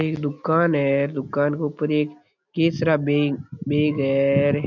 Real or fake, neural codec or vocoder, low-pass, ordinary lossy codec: real; none; 7.2 kHz; none